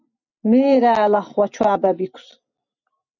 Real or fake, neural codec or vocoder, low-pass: real; none; 7.2 kHz